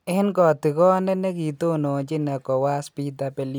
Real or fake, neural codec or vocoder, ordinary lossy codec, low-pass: real; none; none; none